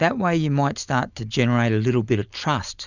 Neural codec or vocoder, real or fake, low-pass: none; real; 7.2 kHz